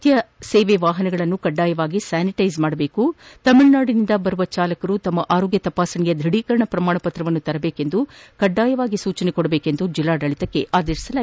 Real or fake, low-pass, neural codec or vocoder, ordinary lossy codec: real; none; none; none